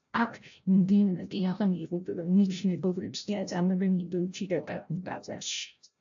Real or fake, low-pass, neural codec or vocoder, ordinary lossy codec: fake; 7.2 kHz; codec, 16 kHz, 0.5 kbps, FreqCodec, larger model; AAC, 64 kbps